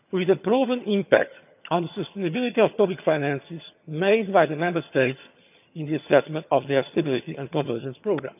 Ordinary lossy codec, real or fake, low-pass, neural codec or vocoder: none; fake; 3.6 kHz; vocoder, 22.05 kHz, 80 mel bands, HiFi-GAN